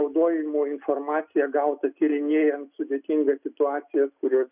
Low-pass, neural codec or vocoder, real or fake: 3.6 kHz; none; real